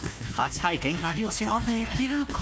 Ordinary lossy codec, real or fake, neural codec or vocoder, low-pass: none; fake; codec, 16 kHz, 1 kbps, FunCodec, trained on Chinese and English, 50 frames a second; none